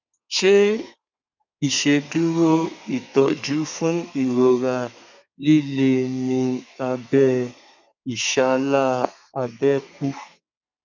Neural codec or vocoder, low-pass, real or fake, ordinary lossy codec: codec, 32 kHz, 1.9 kbps, SNAC; 7.2 kHz; fake; none